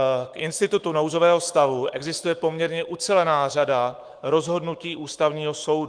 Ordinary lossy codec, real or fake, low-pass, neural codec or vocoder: Opus, 24 kbps; fake; 9.9 kHz; autoencoder, 48 kHz, 128 numbers a frame, DAC-VAE, trained on Japanese speech